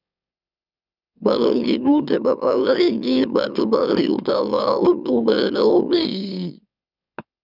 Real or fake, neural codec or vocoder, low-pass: fake; autoencoder, 44.1 kHz, a latent of 192 numbers a frame, MeloTTS; 5.4 kHz